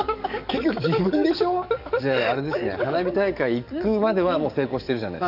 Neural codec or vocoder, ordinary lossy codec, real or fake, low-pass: codec, 44.1 kHz, 7.8 kbps, DAC; none; fake; 5.4 kHz